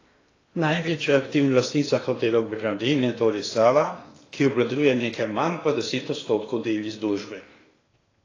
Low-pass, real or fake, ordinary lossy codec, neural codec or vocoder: 7.2 kHz; fake; AAC, 32 kbps; codec, 16 kHz in and 24 kHz out, 0.8 kbps, FocalCodec, streaming, 65536 codes